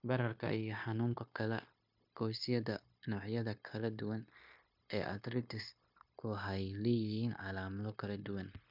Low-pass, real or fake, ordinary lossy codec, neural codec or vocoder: 5.4 kHz; fake; MP3, 48 kbps; codec, 16 kHz, 0.9 kbps, LongCat-Audio-Codec